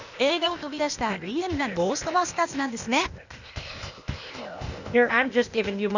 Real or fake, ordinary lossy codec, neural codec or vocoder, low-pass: fake; none; codec, 16 kHz, 0.8 kbps, ZipCodec; 7.2 kHz